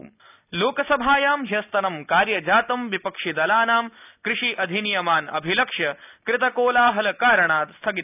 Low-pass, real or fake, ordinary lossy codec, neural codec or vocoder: 3.6 kHz; real; none; none